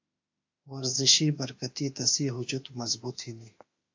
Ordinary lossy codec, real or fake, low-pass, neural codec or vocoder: AAC, 48 kbps; fake; 7.2 kHz; autoencoder, 48 kHz, 32 numbers a frame, DAC-VAE, trained on Japanese speech